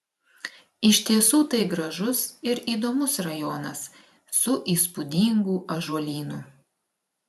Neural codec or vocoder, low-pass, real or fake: vocoder, 44.1 kHz, 128 mel bands every 512 samples, BigVGAN v2; 14.4 kHz; fake